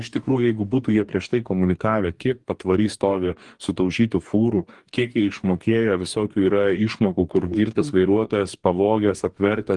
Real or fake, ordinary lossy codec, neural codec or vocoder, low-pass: fake; Opus, 24 kbps; codec, 44.1 kHz, 2.6 kbps, DAC; 10.8 kHz